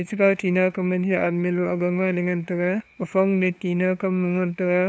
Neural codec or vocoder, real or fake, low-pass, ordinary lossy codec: codec, 16 kHz, 2 kbps, FunCodec, trained on LibriTTS, 25 frames a second; fake; none; none